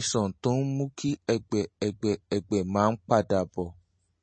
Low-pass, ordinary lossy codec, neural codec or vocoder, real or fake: 9.9 kHz; MP3, 32 kbps; none; real